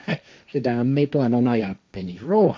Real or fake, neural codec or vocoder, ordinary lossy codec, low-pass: fake; codec, 16 kHz, 1.1 kbps, Voila-Tokenizer; none; none